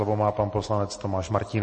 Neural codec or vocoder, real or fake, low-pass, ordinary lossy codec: none; real; 10.8 kHz; MP3, 32 kbps